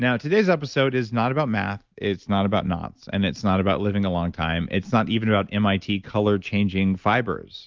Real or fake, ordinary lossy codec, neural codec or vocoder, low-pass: real; Opus, 32 kbps; none; 7.2 kHz